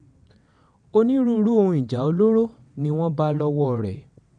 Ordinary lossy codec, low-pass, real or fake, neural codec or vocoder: none; 9.9 kHz; fake; vocoder, 22.05 kHz, 80 mel bands, WaveNeXt